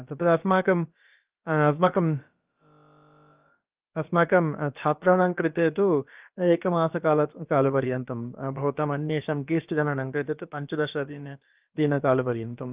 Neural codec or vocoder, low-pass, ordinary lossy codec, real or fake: codec, 16 kHz, about 1 kbps, DyCAST, with the encoder's durations; 3.6 kHz; Opus, 24 kbps; fake